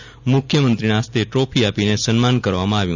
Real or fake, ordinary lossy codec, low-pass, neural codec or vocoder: real; none; 7.2 kHz; none